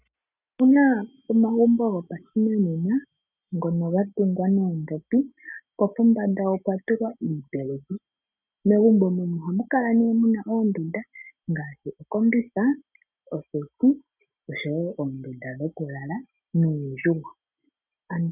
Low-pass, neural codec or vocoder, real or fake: 3.6 kHz; none; real